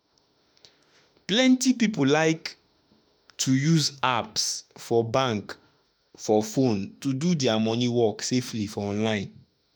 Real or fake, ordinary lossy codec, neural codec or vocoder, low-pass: fake; none; autoencoder, 48 kHz, 32 numbers a frame, DAC-VAE, trained on Japanese speech; none